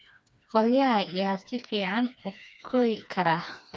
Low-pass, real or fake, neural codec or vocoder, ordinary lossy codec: none; fake; codec, 16 kHz, 2 kbps, FreqCodec, smaller model; none